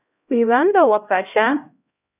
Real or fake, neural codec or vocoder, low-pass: fake; codec, 16 kHz, 0.5 kbps, X-Codec, HuBERT features, trained on LibriSpeech; 3.6 kHz